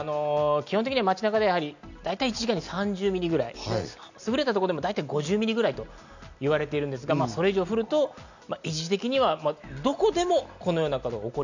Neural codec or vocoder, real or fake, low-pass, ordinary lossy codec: none; real; 7.2 kHz; none